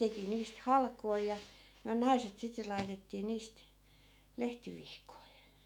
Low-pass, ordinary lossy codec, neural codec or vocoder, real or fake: 19.8 kHz; none; autoencoder, 48 kHz, 128 numbers a frame, DAC-VAE, trained on Japanese speech; fake